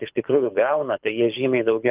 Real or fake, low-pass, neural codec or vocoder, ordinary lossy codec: fake; 3.6 kHz; codec, 24 kHz, 6 kbps, HILCodec; Opus, 24 kbps